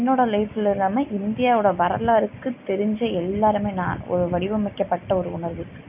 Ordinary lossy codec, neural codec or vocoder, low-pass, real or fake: none; none; 3.6 kHz; real